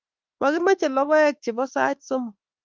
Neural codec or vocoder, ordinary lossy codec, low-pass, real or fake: autoencoder, 48 kHz, 32 numbers a frame, DAC-VAE, trained on Japanese speech; Opus, 24 kbps; 7.2 kHz; fake